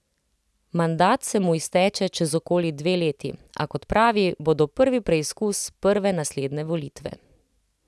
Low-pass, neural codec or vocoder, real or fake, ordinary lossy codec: none; none; real; none